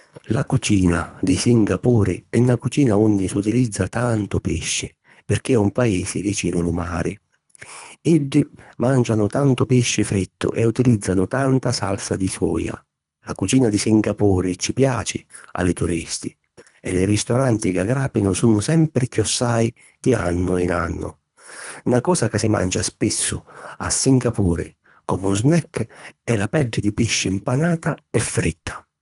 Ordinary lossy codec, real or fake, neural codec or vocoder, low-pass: none; fake; codec, 24 kHz, 3 kbps, HILCodec; 10.8 kHz